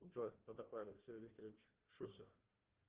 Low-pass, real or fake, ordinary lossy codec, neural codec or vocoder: 3.6 kHz; fake; Opus, 16 kbps; codec, 16 kHz, 1 kbps, FunCodec, trained on Chinese and English, 50 frames a second